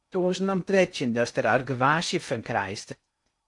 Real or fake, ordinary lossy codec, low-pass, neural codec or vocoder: fake; AAC, 64 kbps; 10.8 kHz; codec, 16 kHz in and 24 kHz out, 0.6 kbps, FocalCodec, streaming, 2048 codes